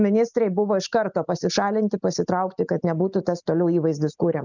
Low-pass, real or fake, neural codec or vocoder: 7.2 kHz; real; none